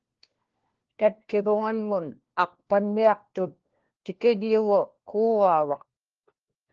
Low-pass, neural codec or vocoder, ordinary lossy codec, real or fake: 7.2 kHz; codec, 16 kHz, 1 kbps, FunCodec, trained on LibriTTS, 50 frames a second; Opus, 16 kbps; fake